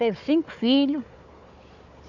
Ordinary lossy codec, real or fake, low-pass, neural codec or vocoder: none; fake; 7.2 kHz; codec, 16 kHz, 4 kbps, FunCodec, trained on Chinese and English, 50 frames a second